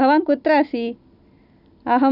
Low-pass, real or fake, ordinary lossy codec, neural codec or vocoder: 5.4 kHz; real; none; none